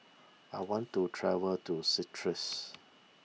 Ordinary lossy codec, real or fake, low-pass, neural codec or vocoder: none; real; none; none